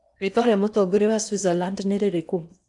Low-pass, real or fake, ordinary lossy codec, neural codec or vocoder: 10.8 kHz; fake; none; codec, 16 kHz in and 24 kHz out, 0.8 kbps, FocalCodec, streaming, 65536 codes